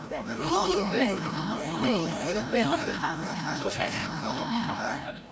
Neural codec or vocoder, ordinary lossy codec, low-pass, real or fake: codec, 16 kHz, 0.5 kbps, FreqCodec, larger model; none; none; fake